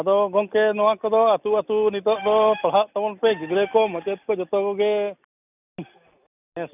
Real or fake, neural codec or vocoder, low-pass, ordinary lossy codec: real; none; 3.6 kHz; none